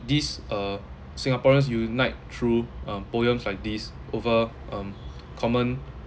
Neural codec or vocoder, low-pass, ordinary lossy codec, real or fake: none; none; none; real